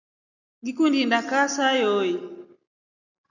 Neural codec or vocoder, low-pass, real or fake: none; 7.2 kHz; real